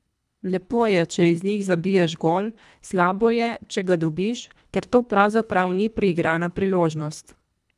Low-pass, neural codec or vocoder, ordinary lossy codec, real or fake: none; codec, 24 kHz, 1.5 kbps, HILCodec; none; fake